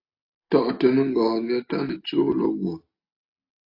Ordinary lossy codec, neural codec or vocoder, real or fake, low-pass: AAC, 32 kbps; none; real; 5.4 kHz